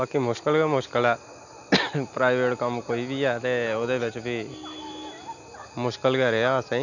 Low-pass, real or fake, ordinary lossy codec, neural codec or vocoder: 7.2 kHz; real; none; none